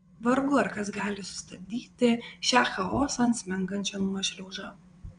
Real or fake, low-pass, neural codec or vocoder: fake; 9.9 kHz; vocoder, 22.05 kHz, 80 mel bands, WaveNeXt